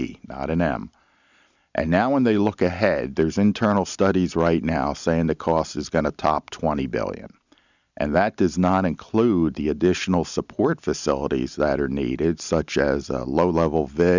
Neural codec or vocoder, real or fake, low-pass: none; real; 7.2 kHz